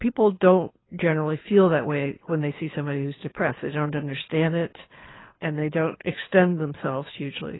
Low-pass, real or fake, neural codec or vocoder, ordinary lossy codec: 7.2 kHz; fake; codec, 24 kHz, 6 kbps, HILCodec; AAC, 16 kbps